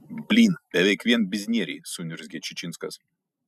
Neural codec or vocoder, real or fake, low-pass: none; real; 14.4 kHz